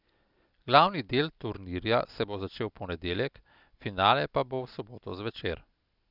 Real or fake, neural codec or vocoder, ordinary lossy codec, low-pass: real; none; Opus, 64 kbps; 5.4 kHz